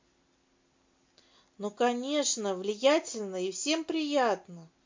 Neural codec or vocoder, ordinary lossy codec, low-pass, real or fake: none; MP3, 48 kbps; 7.2 kHz; real